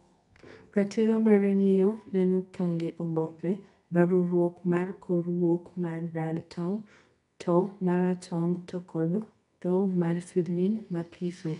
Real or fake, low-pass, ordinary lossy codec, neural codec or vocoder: fake; 10.8 kHz; none; codec, 24 kHz, 0.9 kbps, WavTokenizer, medium music audio release